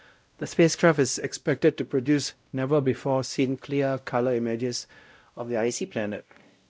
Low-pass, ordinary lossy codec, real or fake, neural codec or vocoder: none; none; fake; codec, 16 kHz, 0.5 kbps, X-Codec, WavLM features, trained on Multilingual LibriSpeech